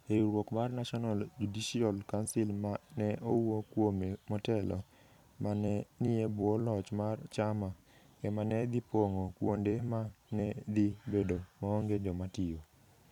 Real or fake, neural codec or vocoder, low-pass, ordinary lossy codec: fake; vocoder, 44.1 kHz, 128 mel bands every 256 samples, BigVGAN v2; 19.8 kHz; none